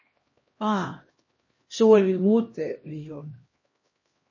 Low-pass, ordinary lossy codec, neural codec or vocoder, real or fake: 7.2 kHz; MP3, 32 kbps; codec, 16 kHz, 1 kbps, X-Codec, HuBERT features, trained on LibriSpeech; fake